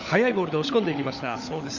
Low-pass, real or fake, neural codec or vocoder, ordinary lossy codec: 7.2 kHz; fake; codec, 16 kHz, 16 kbps, FunCodec, trained on LibriTTS, 50 frames a second; Opus, 64 kbps